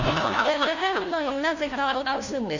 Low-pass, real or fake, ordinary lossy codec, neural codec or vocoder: 7.2 kHz; fake; none; codec, 16 kHz, 1 kbps, FunCodec, trained on LibriTTS, 50 frames a second